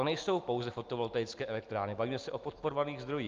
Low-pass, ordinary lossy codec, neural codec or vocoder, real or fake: 7.2 kHz; Opus, 32 kbps; none; real